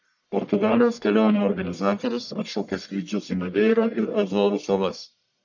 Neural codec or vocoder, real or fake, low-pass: codec, 44.1 kHz, 1.7 kbps, Pupu-Codec; fake; 7.2 kHz